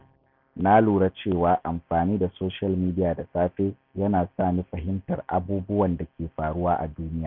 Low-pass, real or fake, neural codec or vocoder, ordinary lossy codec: 5.4 kHz; real; none; none